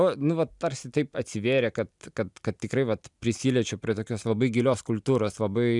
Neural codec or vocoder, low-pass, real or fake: none; 10.8 kHz; real